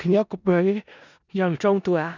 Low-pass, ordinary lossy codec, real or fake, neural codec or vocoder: 7.2 kHz; none; fake; codec, 16 kHz in and 24 kHz out, 0.4 kbps, LongCat-Audio-Codec, four codebook decoder